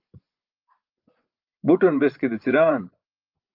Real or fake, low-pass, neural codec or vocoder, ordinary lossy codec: fake; 5.4 kHz; vocoder, 24 kHz, 100 mel bands, Vocos; Opus, 24 kbps